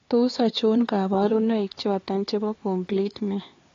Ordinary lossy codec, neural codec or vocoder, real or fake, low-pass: AAC, 32 kbps; codec, 16 kHz, 4 kbps, X-Codec, HuBERT features, trained on LibriSpeech; fake; 7.2 kHz